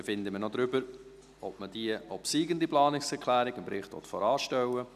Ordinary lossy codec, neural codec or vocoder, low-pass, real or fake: none; none; 14.4 kHz; real